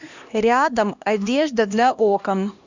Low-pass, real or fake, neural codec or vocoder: 7.2 kHz; fake; codec, 16 kHz, 1 kbps, X-Codec, HuBERT features, trained on LibriSpeech